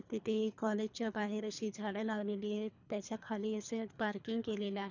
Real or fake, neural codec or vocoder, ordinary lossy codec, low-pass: fake; codec, 24 kHz, 3 kbps, HILCodec; none; 7.2 kHz